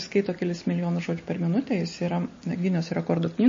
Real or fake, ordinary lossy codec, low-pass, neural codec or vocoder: real; MP3, 32 kbps; 7.2 kHz; none